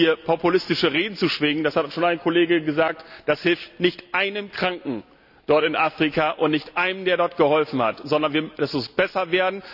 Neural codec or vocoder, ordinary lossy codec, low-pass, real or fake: none; none; 5.4 kHz; real